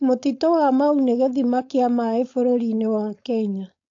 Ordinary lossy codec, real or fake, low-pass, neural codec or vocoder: AAC, 48 kbps; fake; 7.2 kHz; codec, 16 kHz, 4.8 kbps, FACodec